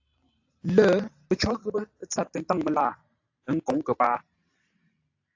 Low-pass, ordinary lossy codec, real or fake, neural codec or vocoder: 7.2 kHz; AAC, 48 kbps; fake; vocoder, 44.1 kHz, 128 mel bands every 512 samples, BigVGAN v2